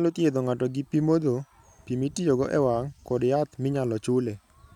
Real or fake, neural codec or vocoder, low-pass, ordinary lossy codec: real; none; 19.8 kHz; none